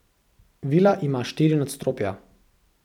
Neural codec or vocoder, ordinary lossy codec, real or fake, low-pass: vocoder, 44.1 kHz, 128 mel bands every 256 samples, BigVGAN v2; none; fake; 19.8 kHz